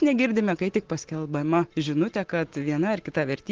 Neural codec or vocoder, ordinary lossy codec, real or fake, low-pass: none; Opus, 16 kbps; real; 7.2 kHz